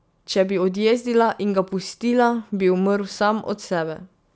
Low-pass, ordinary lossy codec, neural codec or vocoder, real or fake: none; none; none; real